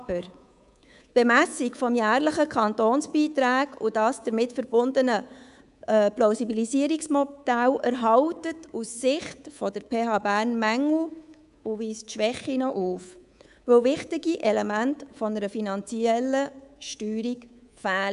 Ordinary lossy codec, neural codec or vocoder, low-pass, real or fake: none; codec, 24 kHz, 3.1 kbps, DualCodec; 10.8 kHz; fake